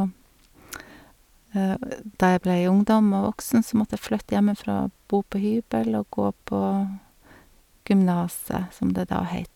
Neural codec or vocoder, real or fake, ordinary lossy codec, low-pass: none; real; none; 19.8 kHz